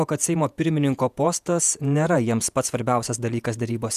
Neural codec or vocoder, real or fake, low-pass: vocoder, 48 kHz, 128 mel bands, Vocos; fake; 14.4 kHz